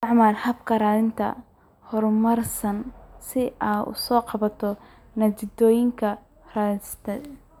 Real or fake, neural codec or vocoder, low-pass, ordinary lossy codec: real; none; 19.8 kHz; none